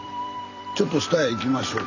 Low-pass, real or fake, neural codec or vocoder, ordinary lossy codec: 7.2 kHz; fake; vocoder, 44.1 kHz, 128 mel bands every 256 samples, BigVGAN v2; none